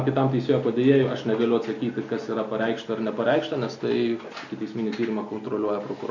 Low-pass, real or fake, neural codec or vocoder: 7.2 kHz; real; none